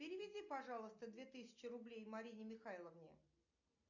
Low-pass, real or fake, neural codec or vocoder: 7.2 kHz; real; none